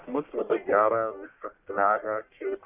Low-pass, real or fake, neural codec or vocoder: 3.6 kHz; fake; codec, 44.1 kHz, 1.7 kbps, Pupu-Codec